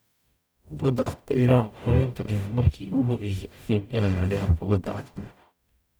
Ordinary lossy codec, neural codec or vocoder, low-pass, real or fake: none; codec, 44.1 kHz, 0.9 kbps, DAC; none; fake